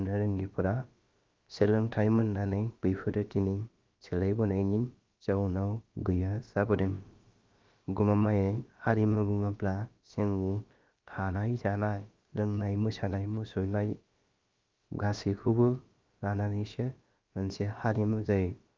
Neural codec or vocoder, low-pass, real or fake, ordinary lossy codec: codec, 16 kHz, about 1 kbps, DyCAST, with the encoder's durations; 7.2 kHz; fake; Opus, 24 kbps